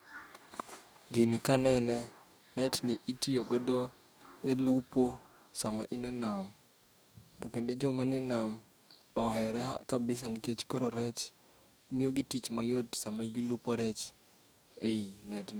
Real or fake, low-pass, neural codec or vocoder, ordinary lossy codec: fake; none; codec, 44.1 kHz, 2.6 kbps, DAC; none